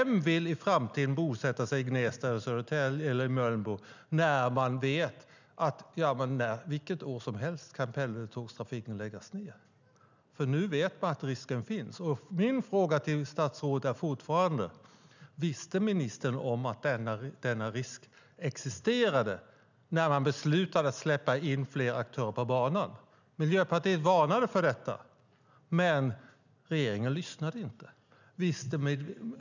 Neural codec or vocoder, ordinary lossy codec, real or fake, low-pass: none; none; real; 7.2 kHz